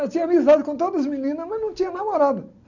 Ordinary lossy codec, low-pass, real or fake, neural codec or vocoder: none; 7.2 kHz; real; none